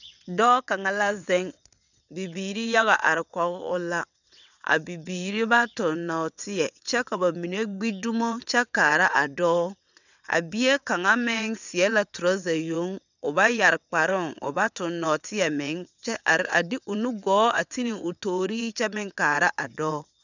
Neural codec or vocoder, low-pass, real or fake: vocoder, 22.05 kHz, 80 mel bands, WaveNeXt; 7.2 kHz; fake